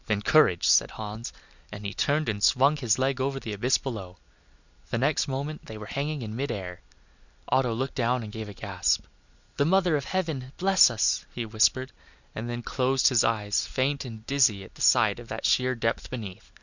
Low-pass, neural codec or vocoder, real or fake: 7.2 kHz; none; real